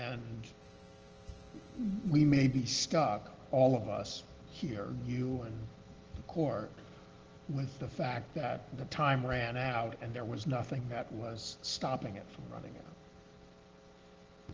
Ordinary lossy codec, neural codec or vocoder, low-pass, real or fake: Opus, 16 kbps; none; 7.2 kHz; real